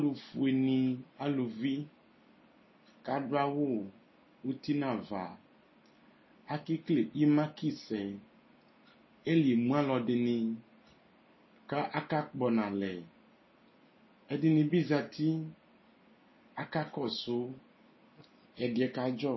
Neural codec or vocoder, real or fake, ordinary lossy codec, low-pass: none; real; MP3, 24 kbps; 7.2 kHz